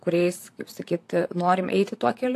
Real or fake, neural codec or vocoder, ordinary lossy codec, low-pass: fake; vocoder, 44.1 kHz, 128 mel bands, Pupu-Vocoder; AAC, 64 kbps; 14.4 kHz